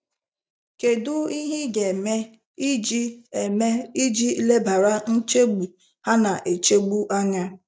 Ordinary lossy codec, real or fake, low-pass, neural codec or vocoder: none; real; none; none